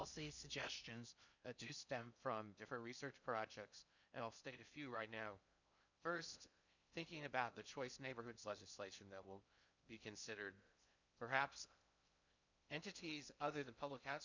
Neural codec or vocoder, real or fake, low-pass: codec, 16 kHz in and 24 kHz out, 0.6 kbps, FocalCodec, streaming, 2048 codes; fake; 7.2 kHz